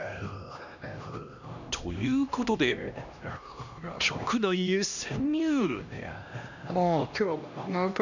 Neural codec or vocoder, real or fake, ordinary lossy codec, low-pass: codec, 16 kHz, 1 kbps, X-Codec, HuBERT features, trained on LibriSpeech; fake; none; 7.2 kHz